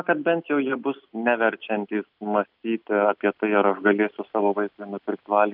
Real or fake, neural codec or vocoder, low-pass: real; none; 5.4 kHz